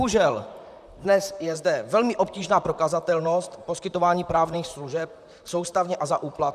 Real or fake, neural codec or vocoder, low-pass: fake; vocoder, 44.1 kHz, 128 mel bands, Pupu-Vocoder; 14.4 kHz